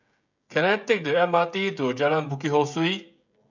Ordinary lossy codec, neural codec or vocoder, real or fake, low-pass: none; codec, 16 kHz, 16 kbps, FreqCodec, smaller model; fake; 7.2 kHz